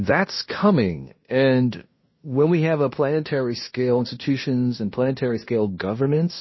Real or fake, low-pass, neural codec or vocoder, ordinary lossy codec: fake; 7.2 kHz; codec, 16 kHz in and 24 kHz out, 0.9 kbps, LongCat-Audio-Codec, fine tuned four codebook decoder; MP3, 24 kbps